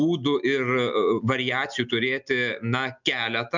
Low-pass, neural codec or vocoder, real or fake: 7.2 kHz; none; real